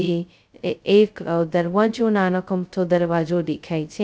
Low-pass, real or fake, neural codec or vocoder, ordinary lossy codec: none; fake; codec, 16 kHz, 0.2 kbps, FocalCodec; none